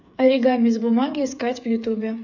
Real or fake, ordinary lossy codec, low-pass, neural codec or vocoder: fake; none; 7.2 kHz; codec, 16 kHz, 8 kbps, FreqCodec, smaller model